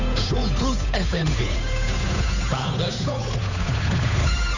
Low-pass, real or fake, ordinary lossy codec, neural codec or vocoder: 7.2 kHz; fake; none; codec, 16 kHz, 8 kbps, FunCodec, trained on Chinese and English, 25 frames a second